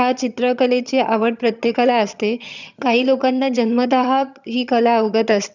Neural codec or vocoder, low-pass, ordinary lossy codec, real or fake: vocoder, 22.05 kHz, 80 mel bands, HiFi-GAN; 7.2 kHz; none; fake